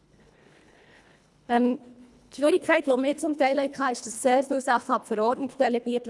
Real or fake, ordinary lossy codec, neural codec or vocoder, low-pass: fake; none; codec, 24 kHz, 1.5 kbps, HILCodec; none